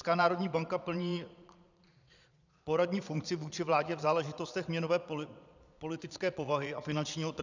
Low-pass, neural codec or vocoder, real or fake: 7.2 kHz; vocoder, 22.05 kHz, 80 mel bands, Vocos; fake